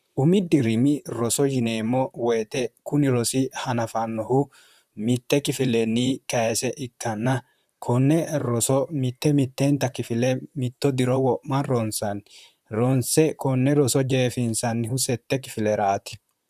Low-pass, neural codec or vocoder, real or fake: 14.4 kHz; vocoder, 44.1 kHz, 128 mel bands, Pupu-Vocoder; fake